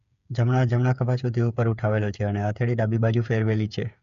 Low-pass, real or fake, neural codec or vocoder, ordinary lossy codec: 7.2 kHz; fake; codec, 16 kHz, 8 kbps, FreqCodec, smaller model; none